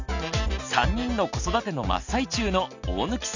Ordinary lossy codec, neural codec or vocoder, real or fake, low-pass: none; none; real; 7.2 kHz